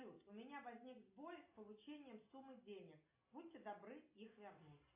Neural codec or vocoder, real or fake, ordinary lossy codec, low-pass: none; real; MP3, 24 kbps; 3.6 kHz